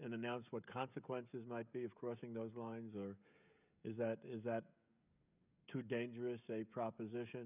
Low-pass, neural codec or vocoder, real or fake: 3.6 kHz; codec, 16 kHz, 16 kbps, FreqCodec, smaller model; fake